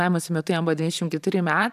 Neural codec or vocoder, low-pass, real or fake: vocoder, 44.1 kHz, 128 mel bands every 512 samples, BigVGAN v2; 14.4 kHz; fake